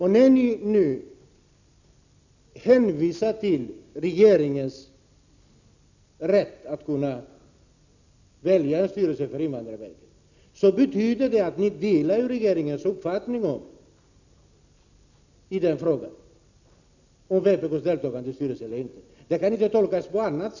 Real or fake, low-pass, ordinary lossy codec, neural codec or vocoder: real; 7.2 kHz; none; none